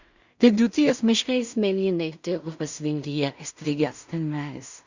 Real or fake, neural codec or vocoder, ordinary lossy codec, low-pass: fake; codec, 16 kHz in and 24 kHz out, 0.4 kbps, LongCat-Audio-Codec, two codebook decoder; Opus, 64 kbps; 7.2 kHz